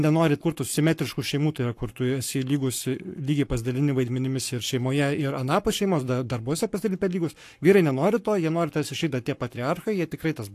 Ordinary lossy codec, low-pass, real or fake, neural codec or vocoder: AAC, 64 kbps; 14.4 kHz; fake; codec, 44.1 kHz, 7.8 kbps, Pupu-Codec